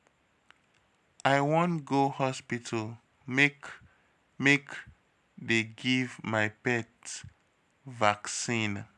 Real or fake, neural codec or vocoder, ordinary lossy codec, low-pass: real; none; none; none